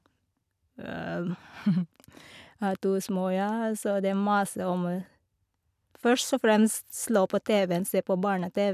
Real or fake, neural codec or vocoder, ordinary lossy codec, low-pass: real; none; none; 14.4 kHz